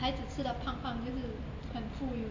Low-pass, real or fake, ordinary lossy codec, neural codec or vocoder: 7.2 kHz; real; AAC, 48 kbps; none